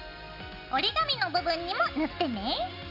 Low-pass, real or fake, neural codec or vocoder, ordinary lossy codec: 5.4 kHz; real; none; none